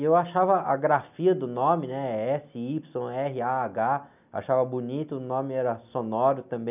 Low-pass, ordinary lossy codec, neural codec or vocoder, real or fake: 3.6 kHz; none; none; real